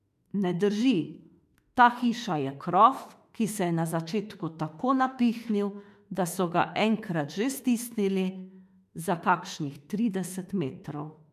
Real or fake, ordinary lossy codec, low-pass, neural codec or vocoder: fake; MP3, 96 kbps; 14.4 kHz; autoencoder, 48 kHz, 32 numbers a frame, DAC-VAE, trained on Japanese speech